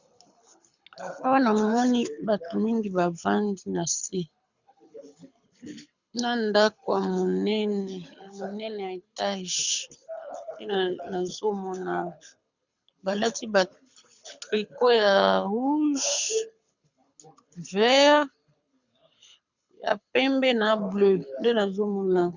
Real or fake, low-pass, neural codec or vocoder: fake; 7.2 kHz; codec, 24 kHz, 6 kbps, HILCodec